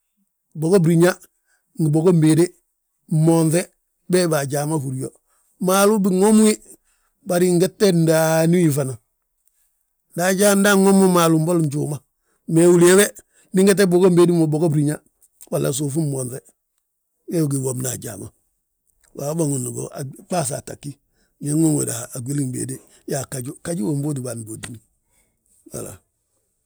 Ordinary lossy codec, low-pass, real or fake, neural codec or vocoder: none; none; real; none